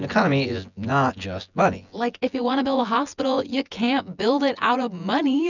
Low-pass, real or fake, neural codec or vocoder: 7.2 kHz; fake; vocoder, 24 kHz, 100 mel bands, Vocos